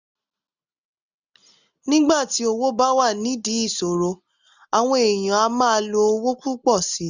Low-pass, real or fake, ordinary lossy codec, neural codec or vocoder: 7.2 kHz; real; none; none